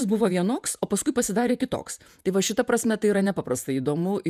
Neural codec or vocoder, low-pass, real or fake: vocoder, 48 kHz, 128 mel bands, Vocos; 14.4 kHz; fake